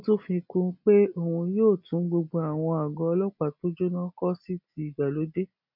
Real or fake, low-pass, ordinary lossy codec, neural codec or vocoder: real; 5.4 kHz; none; none